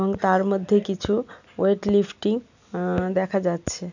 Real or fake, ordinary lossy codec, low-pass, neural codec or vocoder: real; none; 7.2 kHz; none